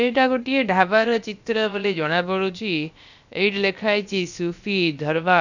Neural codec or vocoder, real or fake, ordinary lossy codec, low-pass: codec, 16 kHz, about 1 kbps, DyCAST, with the encoder's durations; fake; none; 7.2 kHz